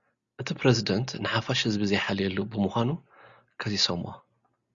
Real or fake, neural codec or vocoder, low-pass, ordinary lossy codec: real; none; 7.2 kHz; Opus, 64 kbps